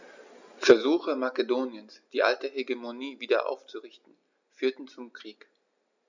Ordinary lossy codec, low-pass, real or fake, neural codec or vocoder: none; 7.2 kHz; real; none